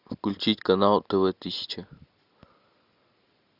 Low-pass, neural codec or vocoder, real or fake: 5.4 kHz; none; real